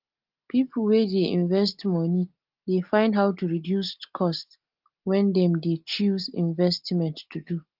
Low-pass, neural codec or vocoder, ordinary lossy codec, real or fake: 5.4 kHz; none; Opus, 32 kbps; real